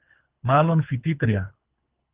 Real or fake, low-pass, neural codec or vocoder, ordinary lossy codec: fake; 3.6 kHz; codec, 24 kHz, 3 kbps, HILCodec; Opus, 32 kbps